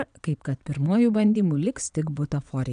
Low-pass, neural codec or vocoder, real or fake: 9.9 kHz; vocoder, 22.05 kHz, 80 mel bands, Vocos; fake